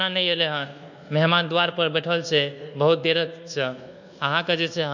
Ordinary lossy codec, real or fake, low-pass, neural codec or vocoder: none; fake; 7.2 kHz; codec, 24 kHz, 1.2 kbps, DualCodec